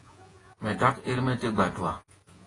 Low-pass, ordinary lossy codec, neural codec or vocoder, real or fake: 10.8 kHz; AAC, 32 kbps; vocoder, 48 kHz, 128 mel bands, Vocos; fake